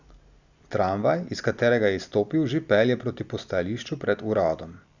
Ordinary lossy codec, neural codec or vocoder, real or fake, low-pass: Opus, 64 kbps; none; real; 7.2 kHz